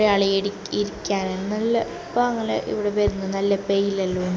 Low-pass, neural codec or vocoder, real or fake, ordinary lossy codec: none; none; real; none